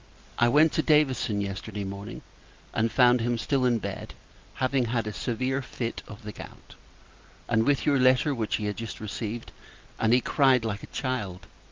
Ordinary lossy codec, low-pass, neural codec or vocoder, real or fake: Opus, 32 kbps; 7.2 kHz; none; real